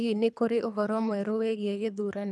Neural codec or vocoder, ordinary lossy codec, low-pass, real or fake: codec, 24 kHz, 3 kbps, HILCodec; none; none; fake